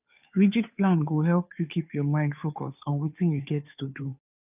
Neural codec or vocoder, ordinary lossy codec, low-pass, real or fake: codec, 16 kHz, 2 kbps, FunCodec, trained on Chinese and English, 25 frames a second; none; 3.6 kHz; fake